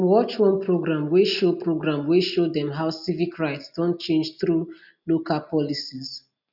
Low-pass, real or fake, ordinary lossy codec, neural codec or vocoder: 5.4 kHz; real; none; none